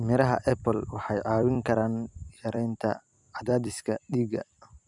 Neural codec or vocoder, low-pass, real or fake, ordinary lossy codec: vocoder, 44.1 kHz, 128 mel bands every 256 samples, BigVGAN v2; 10.8 kHz; fake; none